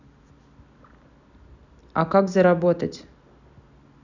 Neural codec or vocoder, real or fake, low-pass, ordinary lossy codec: none; real; 7.2 kHz; none